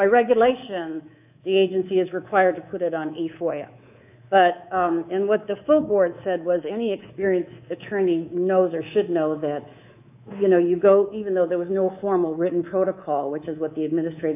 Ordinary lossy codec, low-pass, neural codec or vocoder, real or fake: AAC, 32 kbps; 3.6 kHz; codec, 24 kHz, 3.1 kbps, DualCodec; fake